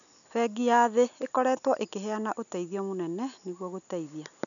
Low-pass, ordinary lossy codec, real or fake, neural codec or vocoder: 7.2 kHz; none; real; none